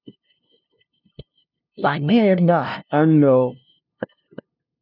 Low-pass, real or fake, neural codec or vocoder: 5.4 kHz; fake; codec, 16 kHz, 0.5 kbps, FunCodec, trained on LibriTTS, 25 frames a second